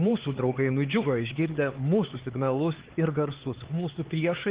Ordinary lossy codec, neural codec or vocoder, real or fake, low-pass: Opus, 16 kbps; codec, 16 kHz, 4 kbps, X-Codec, HuBERT features, trained on LibriSpeech; fake; 3.6 kHz